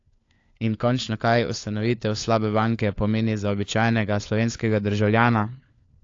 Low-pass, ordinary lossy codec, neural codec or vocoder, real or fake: 7.2 kHz; AAC, 48 kbps; codec, 16 kHz, 4 kbps, FunCodec, trained on LibriTTS, 50 frames a second; fake